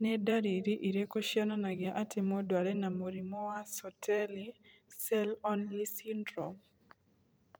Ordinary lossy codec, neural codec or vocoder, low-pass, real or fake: none; vocoder, 44.1 kHz, 128 mel bands every 512 samples, BigVGAN v2; none; fake